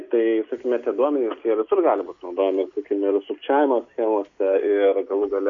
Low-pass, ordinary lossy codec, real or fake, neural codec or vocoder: 7.2 kHz; AAC, 64 kbps; real; none